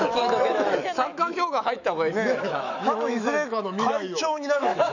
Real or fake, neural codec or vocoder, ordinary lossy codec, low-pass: fake; autoencoder, 48 kHz, 128 numbers a frame, DAC-VAE, trained on Japanese speech; none; 7.2 kHz